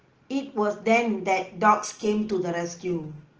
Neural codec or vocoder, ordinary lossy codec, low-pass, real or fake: none; Opus, 16 kbps; 7.2 kHz; real